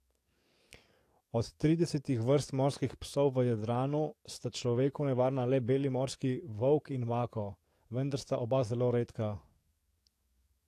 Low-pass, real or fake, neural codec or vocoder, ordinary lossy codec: 14.4 kHz; fake; codec, 44.1 kHz, 7.8 kbps, DAC; AAC, 64 kbps